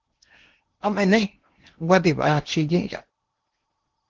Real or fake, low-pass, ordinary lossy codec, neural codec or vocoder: fake; 7.2 kHz; Opus, 16 kbps; codec, 16 kHz in and 24 kHz out, 0.8 kbps, FocalCodec, streaming, 65536 codes